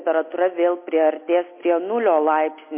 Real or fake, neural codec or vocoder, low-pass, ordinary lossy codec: real; none; 3.6 kHz; MP3, 24 kbps